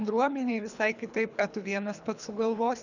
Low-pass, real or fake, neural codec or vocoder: 7.2 kHz; fake; codec, 24 kHz, 3 kbps, HILCodec